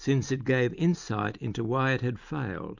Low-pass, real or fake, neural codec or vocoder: 7.2 kHz; real; none